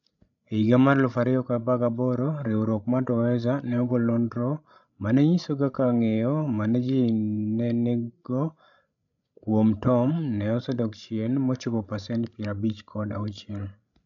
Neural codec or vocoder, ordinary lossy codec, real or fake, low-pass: codec, 16 kHz, 16 kbps, FreqCodec, larger model; none; fake; 7.2 kHz